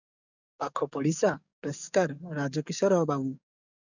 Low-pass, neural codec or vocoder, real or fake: 7.2 kHz; codec, 44.1 kHz, 7.8 kbps, DAC; fake